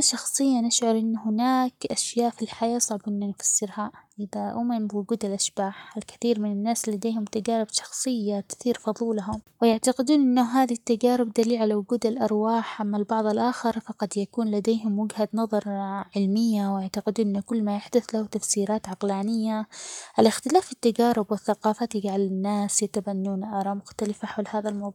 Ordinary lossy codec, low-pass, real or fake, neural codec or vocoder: none; 19.8 kHz; fake; codec, 44.1 kHz, 7.8 kbps, Pupu-Codec